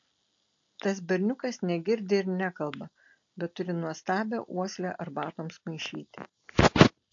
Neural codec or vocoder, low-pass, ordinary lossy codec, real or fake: none; 7.2 kHz; AAC, 48 kbps; real